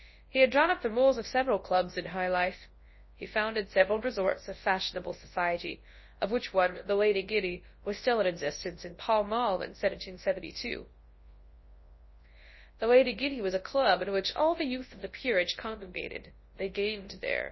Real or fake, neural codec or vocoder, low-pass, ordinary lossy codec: fake; codec, 24 kHz, 0.9 kbps, WavTokenizer, large speech release; 7.2 kHz; MP3, 24 kbps